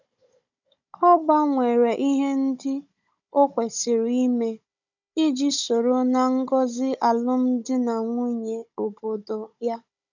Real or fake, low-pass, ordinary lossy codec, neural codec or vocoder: fake; 7.2 kHz; none; codec, 16 kHz, 16 kbps, FunCodec, trained on Chinese and English, 50 frames a second